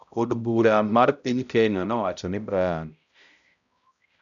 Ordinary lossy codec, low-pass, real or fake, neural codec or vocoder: none; 7.2 kHz; fake; codec, 16 kHz, 0.5 kbps, X-Codec, HuBERT features, trained on balanced general audio